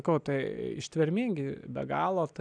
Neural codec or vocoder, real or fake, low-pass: none; real; 9.9 kHz